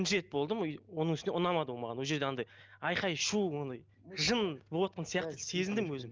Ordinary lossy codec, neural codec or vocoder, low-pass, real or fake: Opus, 32 kbps; none; 7.2 kHz; real